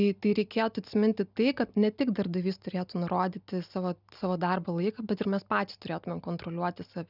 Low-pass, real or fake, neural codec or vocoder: 5.4 kHz; real; none